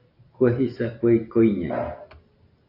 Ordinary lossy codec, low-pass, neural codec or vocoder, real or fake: AAC, 32 kbps; 5.4 kHz; none; real